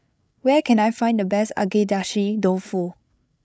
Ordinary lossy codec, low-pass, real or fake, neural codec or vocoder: none; none; fake; codec, 16 kHz, 16 kbps, FreqCodec, larger model